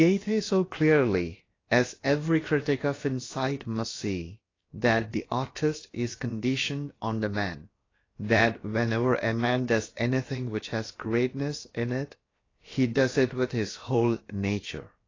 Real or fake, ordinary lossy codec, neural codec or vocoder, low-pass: fake; AAC, 32 kbps; codec, 16 kHz, about 1 kbps, DyCAST, with the encoder's durations; 7.2 kHz